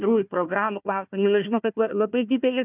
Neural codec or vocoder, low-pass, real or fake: codec, 16 kHz, 1 kbps, FunCodec, trained on Chinese and English, 50 frames a second; 3.6 kHz; fake